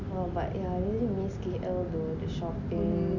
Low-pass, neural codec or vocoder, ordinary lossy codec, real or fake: 7.2 kHz; none; none; real